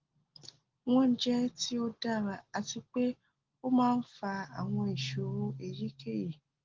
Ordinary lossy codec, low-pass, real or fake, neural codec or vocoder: Opus, 16 kbps; 7.2 kHz; real; none